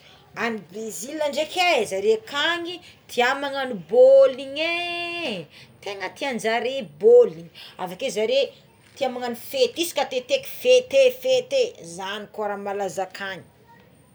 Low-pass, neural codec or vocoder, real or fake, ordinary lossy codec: none; none; real; none